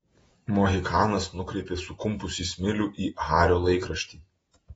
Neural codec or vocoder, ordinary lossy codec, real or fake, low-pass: vocoder, 48 kHz, 128 mel bands, Vocos; AAC, 24 kbps; fake; 19.8 kHz